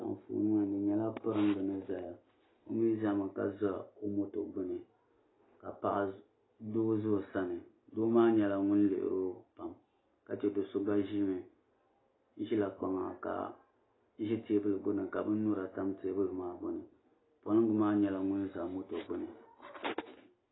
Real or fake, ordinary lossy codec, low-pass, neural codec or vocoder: real; AAC, 16 kbps; 7.2 kHz; none